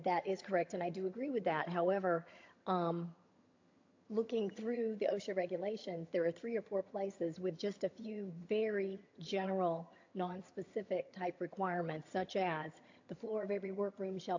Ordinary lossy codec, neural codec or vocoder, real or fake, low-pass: AAC, 48 kbps; vocoder, 22.05 kHz, 80 mel bands, HiFi-GAN; fake; 7.2 kHz